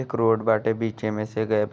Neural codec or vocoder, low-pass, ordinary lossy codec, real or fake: none; none; none; real